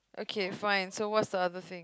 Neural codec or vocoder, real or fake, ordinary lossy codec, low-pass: none; real; none; none